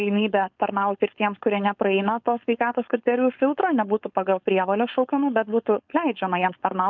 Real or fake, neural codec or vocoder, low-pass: fake; codec, 16 kHz, 4.8 kbps, FACodec; 7.2 kHz